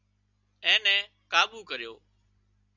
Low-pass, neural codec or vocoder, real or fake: 7.2 kHz; none; real